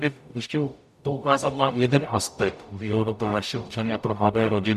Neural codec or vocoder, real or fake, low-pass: codec, 44.1 kHz, 0.9 kbps, DAC; fake; 14.4 kHz